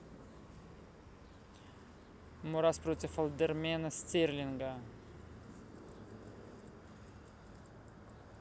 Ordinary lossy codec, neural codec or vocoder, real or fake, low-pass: none; none; real; none